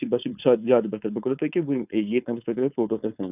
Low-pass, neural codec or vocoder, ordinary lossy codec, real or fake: 3.6 kHz; codec, 16 kHz, 4.8 kbps, FACodec; none; fake